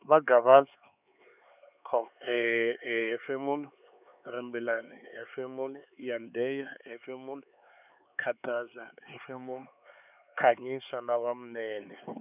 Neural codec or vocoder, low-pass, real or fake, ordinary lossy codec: codec, 16 kHz, 4 kbps, X-Codec, HuBERT features, trained on LibriSpeech; 3.6 kHz; fake; none